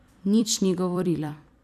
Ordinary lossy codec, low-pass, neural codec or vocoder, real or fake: none; 14.4 kHz; vocoder, 44.1 kHz, 128 mel bands every 256 samples, BigVGAN v2; fake